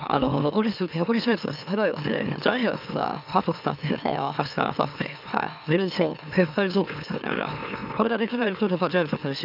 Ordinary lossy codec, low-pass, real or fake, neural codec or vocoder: none; 5.4 kHz; fake; autoencoder, 44.1 kHz, a latent of 192 numbers a frame, MeloTTS